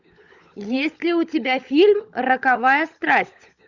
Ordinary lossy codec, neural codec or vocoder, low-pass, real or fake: Opus, 64 kbps; codec, 16 kHz, 16 kbps, FunCodec, trained on LibriTTS, 50 frames a second; 7.2 kHz; fake